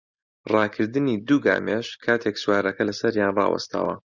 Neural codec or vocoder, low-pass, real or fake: none; 7.2 kHz; real